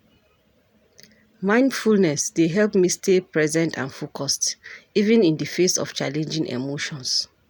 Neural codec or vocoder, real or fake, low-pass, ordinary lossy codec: none; real; none; none